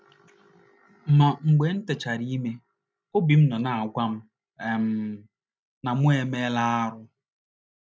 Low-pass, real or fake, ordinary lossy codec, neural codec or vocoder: none; real; none; none